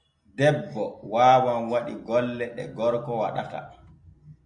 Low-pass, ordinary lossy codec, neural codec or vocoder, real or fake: 9.9 kHz; AAC, 64 kbps; none; real